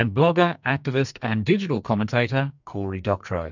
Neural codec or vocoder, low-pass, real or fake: codec, 44.1 kHz, 2.6 kbps, SNAC; 7.2 kHz; fake